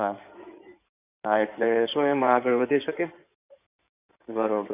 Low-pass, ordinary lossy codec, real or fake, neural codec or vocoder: 3.6 kHz; none; fake; codec, 16 kHz in and 24 kHz out, 2.2 kbps, FireRedTTS-2 codec